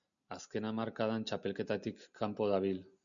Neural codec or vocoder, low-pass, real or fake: none; 7.2 kHz; real